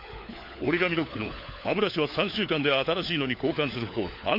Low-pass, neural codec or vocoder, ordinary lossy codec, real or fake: 5.4 kHz; codec, 16 kHz, 4 kbps, FunCodec, trained on LibriTTS, 50 frames a second; MP3, 32 kbps; fake